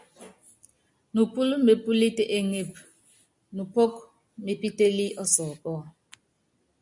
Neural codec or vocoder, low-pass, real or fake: none; 10.8 kHz; real